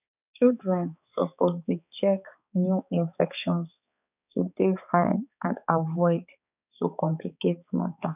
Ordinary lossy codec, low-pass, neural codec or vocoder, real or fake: none; 3.6 kHz; codec, 16 kHz, 4 kbps, X-Codec, HuBERT features, trained on balanced general audio; fake